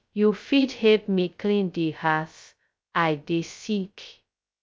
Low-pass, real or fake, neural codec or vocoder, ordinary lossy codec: none; fake; codec, 16 kHz, 0.2 kbps, FocalCodec; none